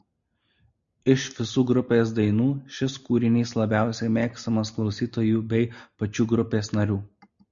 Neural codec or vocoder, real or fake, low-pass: none; real; 7.2 kHz